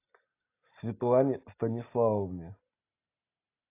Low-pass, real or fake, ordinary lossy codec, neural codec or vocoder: 3.6 kHz; real; AAC, 24 kbps; none